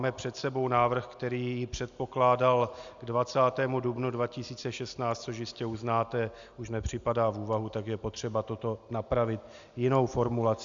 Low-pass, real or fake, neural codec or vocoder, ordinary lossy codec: 7.2 kHz; real; none; Opus, 64 kbps